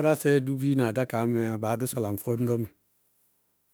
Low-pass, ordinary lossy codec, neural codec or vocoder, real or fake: none; none; autoencoder, 48 kHz, 32 numbers a frame, DAC-VAE, trained on Japanese speech; fake